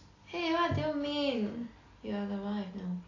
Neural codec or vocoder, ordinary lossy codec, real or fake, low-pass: none; AAC, 32 kbps; real; 7.2 kHz